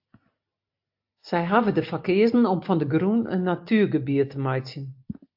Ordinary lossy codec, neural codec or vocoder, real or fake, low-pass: AAC, 48 kbps; none; real; 5.4 kHz